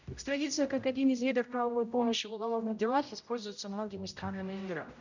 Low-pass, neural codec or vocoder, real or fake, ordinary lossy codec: 7.2 kHz; codec, 16 kHz, 0.5 kbps, X-Codec, HuBERT features, trained on general audio; fake; none